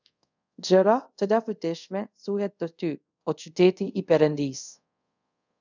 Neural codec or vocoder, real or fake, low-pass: codec, 24 kHz, 0.5 kbps, DualCodec; fake; 7.2 kHz